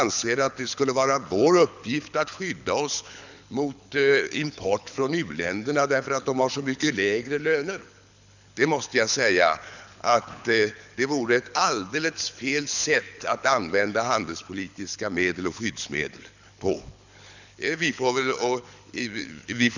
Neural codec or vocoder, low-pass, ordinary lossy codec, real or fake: codec, 24 kHz, 6 kbps, HILCodec; 7.2 kHz; none; fake